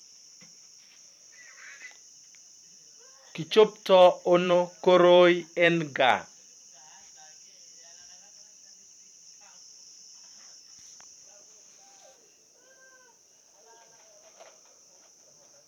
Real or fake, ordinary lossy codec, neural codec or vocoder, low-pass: fake; MP3, 96 kbps; vocoder, 44.1 kHz, 128 mel bands every 512 samples, BigVGAN v2; 19.8 kHz